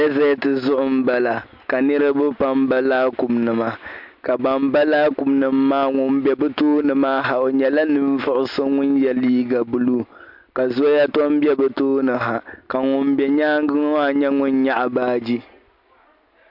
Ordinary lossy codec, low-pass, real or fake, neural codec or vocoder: MP3, 48 kbps; 5.4 kHz; real; none